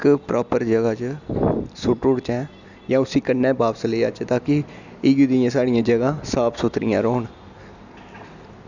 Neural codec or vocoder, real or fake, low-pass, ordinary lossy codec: none; real; 7.2 kHz; none